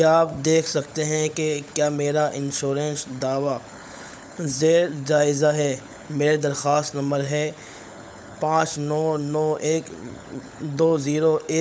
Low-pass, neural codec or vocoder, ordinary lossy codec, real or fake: none; codec, 16 kHz, 16 kbps, FunCodec, trained on LibriTTS, 50 frames a second; none; fake